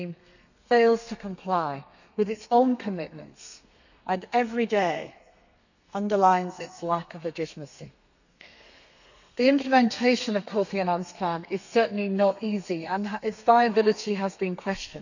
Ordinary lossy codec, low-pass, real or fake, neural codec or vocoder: none; 7.2 kHz; fake; codec, 32 kHz, 1.9 kbps, SNAC